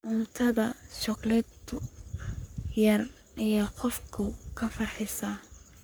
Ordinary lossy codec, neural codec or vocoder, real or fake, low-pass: none; codec, 44.1 kHz, 3.4 kbps, Pupu-Codec; fake; none